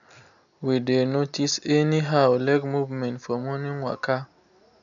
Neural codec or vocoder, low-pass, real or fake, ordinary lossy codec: none; 7.2 kHz; real; none